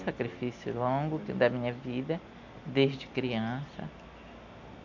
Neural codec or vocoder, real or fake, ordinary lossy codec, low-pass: none; real; none; 7.2 kHz